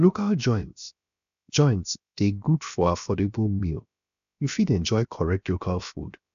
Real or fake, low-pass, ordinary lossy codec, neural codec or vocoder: fake; 7.2 kHz; AAC, 96 kbps; codec, 16 kHz, 0.7 kbps, FocalCodec